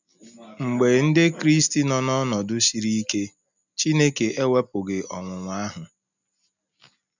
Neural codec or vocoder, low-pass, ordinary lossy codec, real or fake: none; 7.2 kHz; none; real